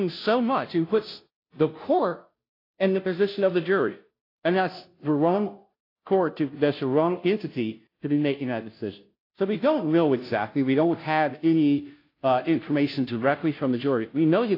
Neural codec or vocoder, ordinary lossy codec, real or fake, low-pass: codec, 16 kHz, 0.5 kbps, FunCodec, trained on Chinese and English, 25 frames a second; AAC, 32 kbps; fake; 5.4 kHz